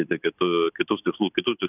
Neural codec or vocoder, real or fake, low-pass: none; real; 3.6 kHz